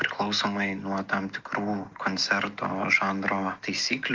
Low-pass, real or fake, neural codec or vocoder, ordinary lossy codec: 7.2 kHz; real; none; Opus, 32 kbps